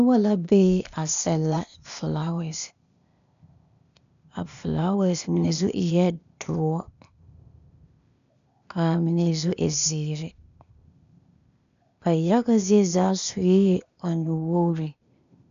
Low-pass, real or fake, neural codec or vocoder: 7.2 kHz; fake; codec, 16 kHz, 0.8 kbps, ZipCodec